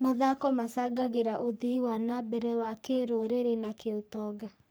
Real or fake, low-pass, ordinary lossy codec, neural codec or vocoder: fake; none; none; codec, 44.1 kHz, 3.4 kbps, Pupu-Codec